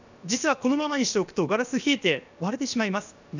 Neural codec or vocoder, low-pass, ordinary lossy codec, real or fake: codec, 16 kHz, about 1 kbps, DyCAST, with the encoder's durations; 7.2 kHz; none; fake